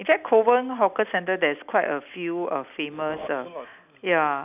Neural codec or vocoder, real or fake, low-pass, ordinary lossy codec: none; real; 3.6 kHz; none